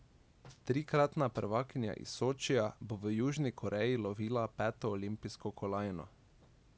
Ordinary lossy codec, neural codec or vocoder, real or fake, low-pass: none; none; real; none